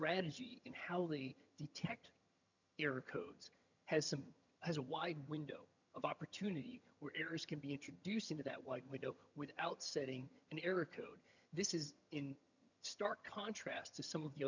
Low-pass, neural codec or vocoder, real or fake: 7.2 kHz; vocoder, 22.05 kHz, 80 mel bands, HiFi-GAN; fake